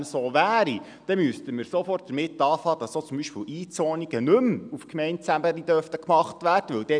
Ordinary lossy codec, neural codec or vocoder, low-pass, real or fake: none; none; 9.9 kHz; real